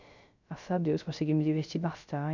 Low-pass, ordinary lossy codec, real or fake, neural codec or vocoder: 7.2 kHz; none; fake; codec, 16 kHz, 0.3 kbps, FocalCodec